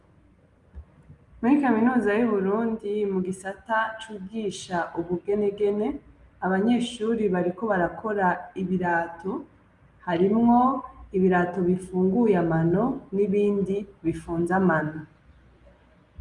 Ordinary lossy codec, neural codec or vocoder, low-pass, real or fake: Opus, 32 kbps; none; 10.8 kHz; real